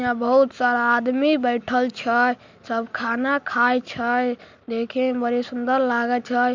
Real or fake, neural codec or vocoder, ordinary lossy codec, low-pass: real; none; MP3, 64 kbps; 7.2 kHz